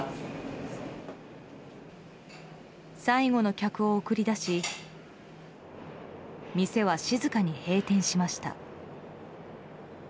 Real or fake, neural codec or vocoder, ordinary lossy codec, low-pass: real; none; none; none